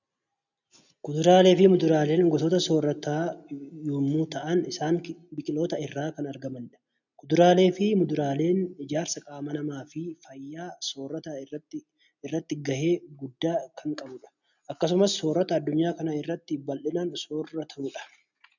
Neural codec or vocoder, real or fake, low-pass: none; real; 7.2 kHz